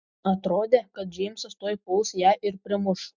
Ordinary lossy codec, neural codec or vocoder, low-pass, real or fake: MP3, 64 kbps; none; 7.2 kHz; real